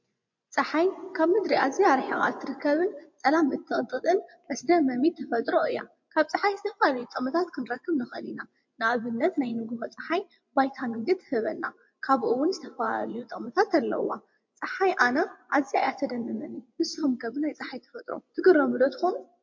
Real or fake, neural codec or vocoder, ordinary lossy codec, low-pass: fake; vocoder, 24 kHz, 100 mel bands, Vocos; MP3, 48 kbps; 7.2 kHz